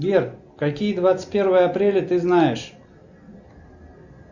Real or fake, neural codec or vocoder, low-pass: real; none; 7.2 kHz